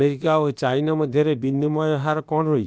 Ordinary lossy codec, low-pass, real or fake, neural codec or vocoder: none; none; fake; codec, 16 kHz, about 1 kbps, DyCAST, with the encoder's durations